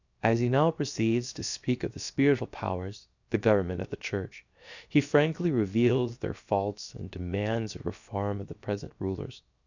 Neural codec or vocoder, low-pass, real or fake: codec, 16 kHz, 0.7 kbps, FocalCodec; 7.2 kHz; fake